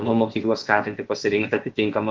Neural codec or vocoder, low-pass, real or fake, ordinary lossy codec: codec, 16 kHz, about 1 kbps, DyCAST, with the encoder's durations; 7.2 kHz; fake; Opus, 16 kbps